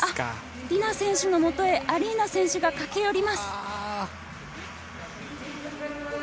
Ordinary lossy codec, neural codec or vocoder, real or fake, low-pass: none; none; real; none